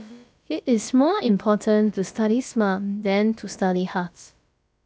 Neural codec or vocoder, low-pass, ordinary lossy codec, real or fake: codec, 16 kHz, about 1 kbps, DyCAST, with the encoder's durations; none; none; fake